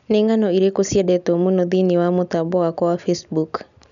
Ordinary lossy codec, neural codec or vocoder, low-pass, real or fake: none; none; 7.2 kHz; real